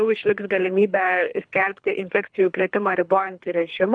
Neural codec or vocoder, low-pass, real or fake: codec, 24 kHz, 3 kbps, HILCodec; 9.9 kHz; fake